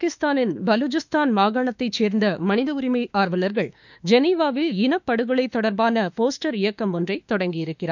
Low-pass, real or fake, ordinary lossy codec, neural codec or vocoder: 7.2 kHz; fake; none; autoencoder, 48 kHz, 32 numbers a frame, DAC-VAE, trained on Japanese speech